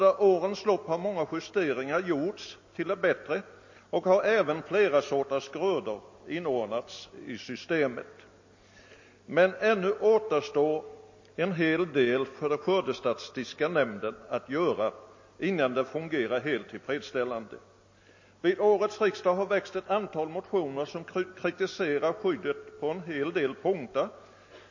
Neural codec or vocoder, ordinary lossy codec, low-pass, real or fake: none; MP3, 32 kbps; 7.2 kHz; real